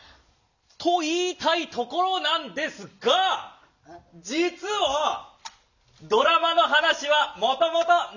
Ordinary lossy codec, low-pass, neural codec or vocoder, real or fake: none; 7.2 kHz; none; real